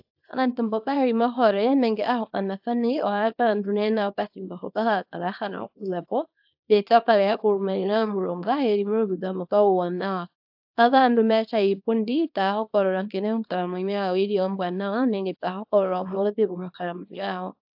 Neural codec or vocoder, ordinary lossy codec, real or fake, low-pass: codec, 24 kHz, 0.9 kbps, WavTokenizer, small release; AAC, 48 kbps; fake; 5.4 kHz